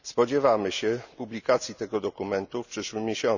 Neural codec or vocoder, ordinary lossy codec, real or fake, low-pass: none; none; real; 7.2 kHz